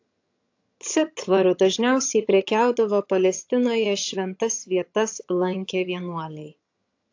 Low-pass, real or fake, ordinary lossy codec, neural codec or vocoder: 7.2 kHz; fake; AAC, 48 kbps; vocoder, 22.05 kHz, 80 mel bands, HiFi-GAN